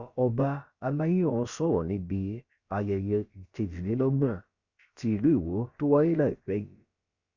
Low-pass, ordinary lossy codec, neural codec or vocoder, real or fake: 7.2 kHz; Opus, 64 kbps; codec, 16 kHz, about 1 kbps, DyCAST, with the encoder's durations; fake